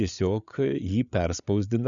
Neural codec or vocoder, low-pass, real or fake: codec, 16 kHz, 8 kbps, FreqCodec, larger model; 7.2 kHz; fake